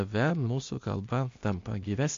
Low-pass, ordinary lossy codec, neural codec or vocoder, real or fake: 7.2 kHz; MP3, 48 kbps; codec, 16 kHz, 0.8 kbps, ZipCodec; fake